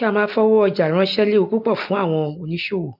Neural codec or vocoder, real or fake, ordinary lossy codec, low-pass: none; real; none; 5.4 kHz